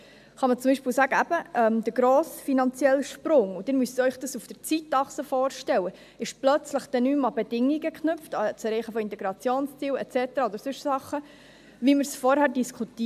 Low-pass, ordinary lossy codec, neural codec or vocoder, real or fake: 14.4 kHz; AAC, 96 kbps; none; real